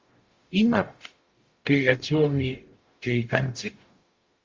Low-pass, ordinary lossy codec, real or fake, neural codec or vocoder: 7.2 kHz; Opus, 32 kbps; fake; codec, 44.1 kHz, 0.9 kbps, DAC